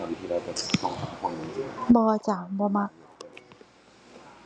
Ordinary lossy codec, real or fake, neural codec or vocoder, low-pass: none; real; none; none